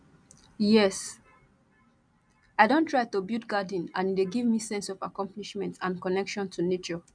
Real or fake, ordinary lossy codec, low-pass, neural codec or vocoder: real; none; 9.9 kHz; none